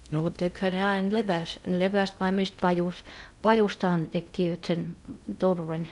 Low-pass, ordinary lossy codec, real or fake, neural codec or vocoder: 10.8 kHz; none; fake; codec, 16 kHz in and 24 kHz out, 0.6 kbps, FocalCodec, streaming, 2048 codes